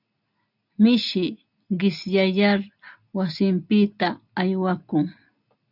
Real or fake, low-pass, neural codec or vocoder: real; 5.4 kHz; none